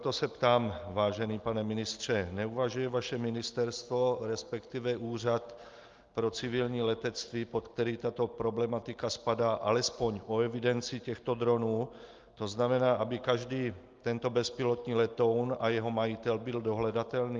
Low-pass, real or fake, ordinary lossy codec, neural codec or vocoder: 7.2 kHz; real; Opus, 32 kbps; none